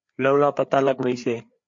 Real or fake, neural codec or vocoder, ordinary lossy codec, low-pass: fake; codec, 16 kHz, 4 kbps, FreqCodec, larger model; MP3, 48 kbps; 7.2 kHz